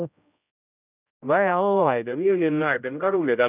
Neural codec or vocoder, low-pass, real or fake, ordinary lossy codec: codec, 16 kHz, 0.5 kbps, X-Codec, HuBERT features, trained on general audio; 3.6 kHz; fake; none